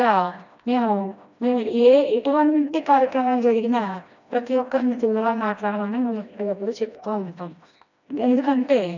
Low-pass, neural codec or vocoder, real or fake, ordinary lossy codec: 7.2 kHz; codec, 16 kHz, 1 kbps, FreqCodec, smaller model; fake; none